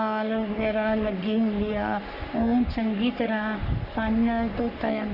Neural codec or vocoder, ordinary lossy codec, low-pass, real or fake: codec, 44.1 kHz, 3.4 kbps, Pupu-Codec; none; 5.4 kHz; fake